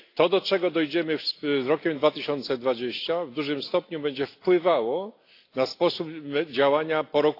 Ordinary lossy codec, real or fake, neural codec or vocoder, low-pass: AAC, 32 kbps; real; none; 5.4 kHz